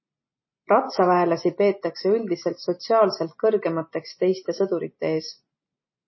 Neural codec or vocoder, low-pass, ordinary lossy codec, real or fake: none; 7.2 kHz; MP3, 24 kbps; real